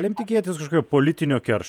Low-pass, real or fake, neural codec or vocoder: 19.8 kHz; fake; vocoder, 48 kHz, 128 mel bands, Vocos